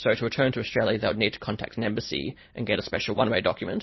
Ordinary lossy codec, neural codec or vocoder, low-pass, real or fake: MP3, 24 kbps; none; 7.2 kHz; real